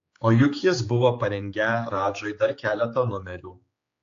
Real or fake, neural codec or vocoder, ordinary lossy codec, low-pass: fake; codec, 16 kHz, 4 kbps, X-Codec, HuBERT features, trained on general audio; AAC, 48 kbps; 7.2 kHz